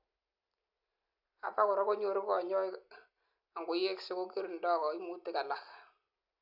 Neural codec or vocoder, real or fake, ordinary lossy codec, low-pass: none; real; none; 5.4 kHz